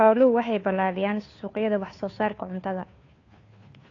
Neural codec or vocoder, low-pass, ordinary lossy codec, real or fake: codec, 16 kHz, 2 kbps, FunCodec, trained on Chinese and English, 25 frames a second; 7.2 kHz; AAC, 32 kbps; fake